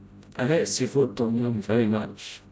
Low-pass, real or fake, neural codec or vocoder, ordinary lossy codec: none; fake; codec, 16 kHz, 0.5 kbps, FreqCodec, smaller model; none